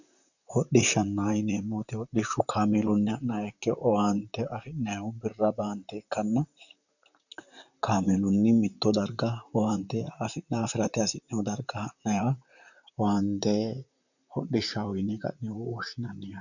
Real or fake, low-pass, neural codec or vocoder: fake; 7.2 kHz; vocoder, 44.1 kHz, 128 mel bands, Pupu-Vocoder